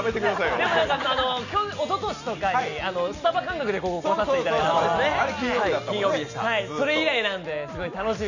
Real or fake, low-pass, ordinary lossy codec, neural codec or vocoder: real; 7.2 kHz; none; none